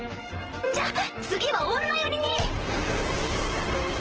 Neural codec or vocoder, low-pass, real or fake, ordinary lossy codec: vocoder, 44.1 kHz, 128 mel bands, Pupu-Vocoder; 7.2 kHz; fake; Opus, 16 kbps